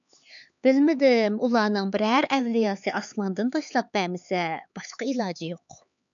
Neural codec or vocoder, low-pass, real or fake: codec, 16 kHz, 4 kbps, X-Codec, HuBERT features, trained on LibriSpeech; 7.2 kHz; fake